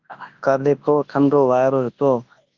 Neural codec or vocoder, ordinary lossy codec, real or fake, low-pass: codec, 24 kHz, 0.9 kbps, WavTokenizer, large speech release; Opus, 32 kbps; fake; 7.2 kHz